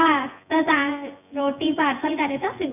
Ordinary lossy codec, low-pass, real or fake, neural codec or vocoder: none; 3.6 kHz; fake; vocoder, 24 kHz, 100 mel bands, Vocos